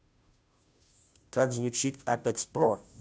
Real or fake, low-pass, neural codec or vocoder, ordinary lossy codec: fake; none; codec, 16 kHz, 0.5 kbps, FunCodec, trained on Chinese and English, 25 frames a second; none